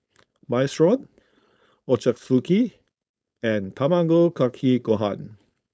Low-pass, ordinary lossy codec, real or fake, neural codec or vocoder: none; none; fake; codec, 16 kHz, 4.8 kbps, FACodec